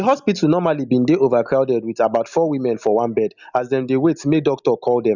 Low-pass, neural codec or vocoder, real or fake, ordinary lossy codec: 7.2 kHz; none; real; none